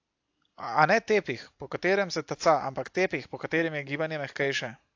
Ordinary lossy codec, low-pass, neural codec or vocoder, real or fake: AAC, 48 kbps; 7.2 kHz; none; real